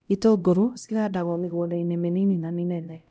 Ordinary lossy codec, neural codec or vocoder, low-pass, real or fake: none; codec, 16 kHz, 0.5 kbps, X-Codec, HuBERT features, trained on LibriSpeech; none; fake